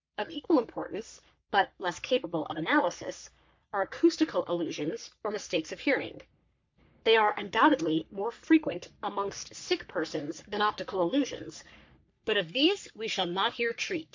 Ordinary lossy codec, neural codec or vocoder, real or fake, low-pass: MP3, 64 kbps; codec, 44.1 kHz, 3.4 kbps, Pupu-Codec; fake; 7.2 kHz